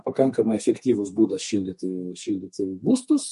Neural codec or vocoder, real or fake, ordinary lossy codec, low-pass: codec, 32 kHz, 1.9 kbps, SNAC; fake; MP3, 48 kbps; 14.4 kHz